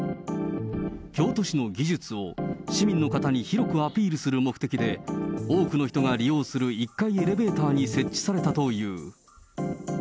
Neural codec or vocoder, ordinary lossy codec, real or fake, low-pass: none; none; real; none